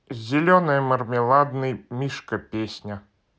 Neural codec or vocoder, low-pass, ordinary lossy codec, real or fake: none; none; none; real